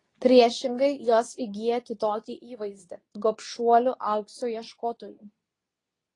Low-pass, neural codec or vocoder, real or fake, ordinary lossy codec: 10.8 kHz; codec, 24 kHz, 0.9 kbps, WavTokenizer, medium speech release version 2; fake; AAC, 32 kbps